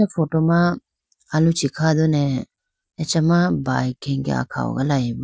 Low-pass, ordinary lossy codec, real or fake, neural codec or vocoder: none; none; real; none